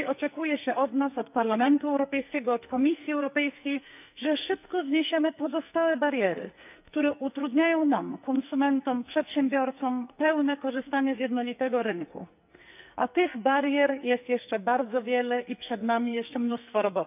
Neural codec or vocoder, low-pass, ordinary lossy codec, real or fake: codec, 44.1 kHz, 2.6 kbps, SNAC; 3.6 kHz; none; fake